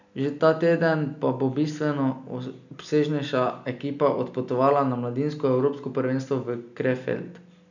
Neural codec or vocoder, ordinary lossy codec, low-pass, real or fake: none; none; 7.2 kHz; real